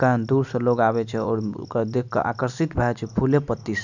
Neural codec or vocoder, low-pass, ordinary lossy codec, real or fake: none; 7.2 kHz; none; real